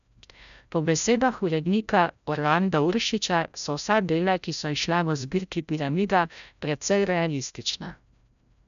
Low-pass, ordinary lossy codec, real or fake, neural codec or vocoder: 7.2 kHz; none; fake; codec, 16 kHz, 0.5 kbps, FreqCodec, larger model